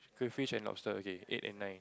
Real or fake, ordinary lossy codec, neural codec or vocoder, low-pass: real; none; none; none